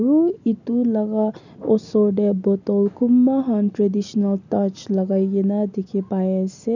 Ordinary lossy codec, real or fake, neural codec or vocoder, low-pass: none; real; none; 7.2 kHz